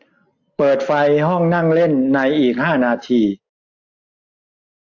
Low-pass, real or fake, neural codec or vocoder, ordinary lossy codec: 7.2 kHz; real; none; AAC, 48 kbps